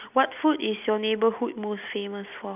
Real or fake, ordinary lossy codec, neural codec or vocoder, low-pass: real; none; none; 3.6 kHz